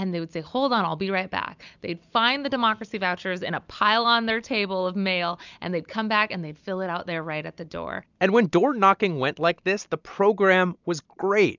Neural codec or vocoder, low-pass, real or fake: none; 7.2 kHz; real